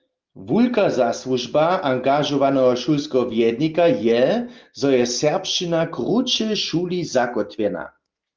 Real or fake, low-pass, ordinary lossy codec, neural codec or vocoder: real; 7.2 kHz; Opus, 32 kbps; none